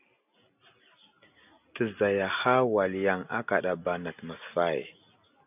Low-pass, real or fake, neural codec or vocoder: 3.6 kHz; real; none